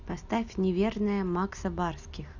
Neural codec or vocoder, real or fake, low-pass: none; real; 7.2 kHz